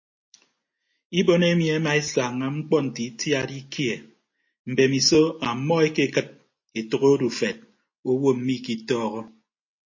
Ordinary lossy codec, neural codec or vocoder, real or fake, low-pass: MP3, 32 kbps; none; real; 7.2 kHz